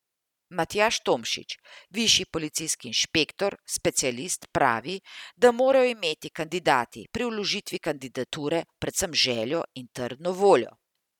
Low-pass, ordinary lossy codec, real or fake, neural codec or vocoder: 19.8 kHz; none; real; none